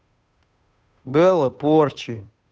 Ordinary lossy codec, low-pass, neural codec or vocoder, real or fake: none; none; codec, 16 kHz, 2 kbps, FunCodec, trained on Chinese and English, 25 frames a second; fake